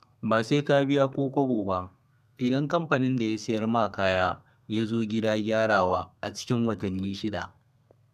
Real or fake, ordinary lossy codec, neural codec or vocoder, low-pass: fake; none; codec, 32 kHz, 1.9 kbps, SNAC; 14.4 kHz